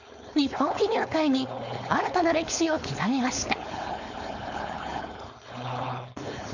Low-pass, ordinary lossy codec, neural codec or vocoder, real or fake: 7.2 kHz; none; codec, 16 kHz, 4.8 kbps, FACodec; fake